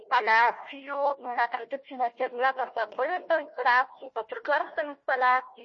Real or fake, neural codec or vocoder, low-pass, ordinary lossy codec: fake; codec, 16 kHz, 1 kbps, FunCodec, trained on Chinese and English, 50 frames a second; 7.2 kHz; MP3, 32 kbps